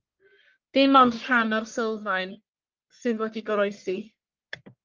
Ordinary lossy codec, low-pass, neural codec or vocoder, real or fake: Opus, 32 kbps; 7.2 kHz; codec, 44.1 kHz, 1.7 kbps, Pupu-Codec; fake